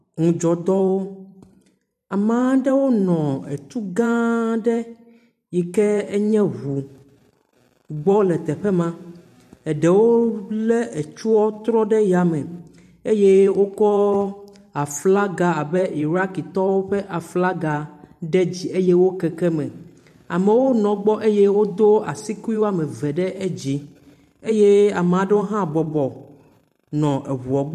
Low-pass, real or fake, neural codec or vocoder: 14.4 kHz; fake; vocoder, 44.1 kHz, 128 mel bands every 512 samples, BigVGAN v2